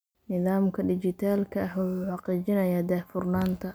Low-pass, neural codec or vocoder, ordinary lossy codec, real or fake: none; none; none; real